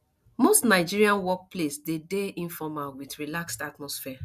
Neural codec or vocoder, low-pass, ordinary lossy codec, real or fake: none; 14.4 kHz; none; real